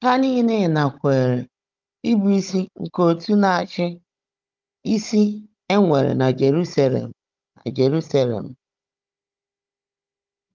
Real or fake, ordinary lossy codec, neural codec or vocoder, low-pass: fake; Opus, 24 kbps; codec, 16 kHz, 16 kbps, FunCodec, trained on Chinese and English, 50 frames a second; 7.2 kHz